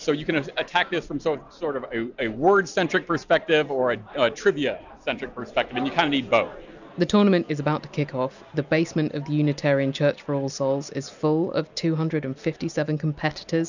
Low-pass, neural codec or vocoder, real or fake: 7.2 kHz; none; real